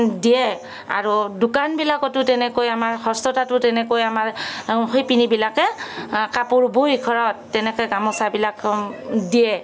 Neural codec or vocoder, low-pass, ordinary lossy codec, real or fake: none; none; none; real